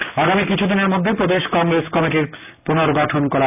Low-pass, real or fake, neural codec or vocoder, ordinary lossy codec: 3.6 kHz; real; none; none